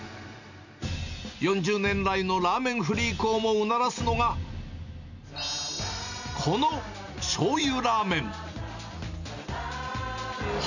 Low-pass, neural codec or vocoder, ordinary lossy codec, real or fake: 7.2 kHz; none; none; real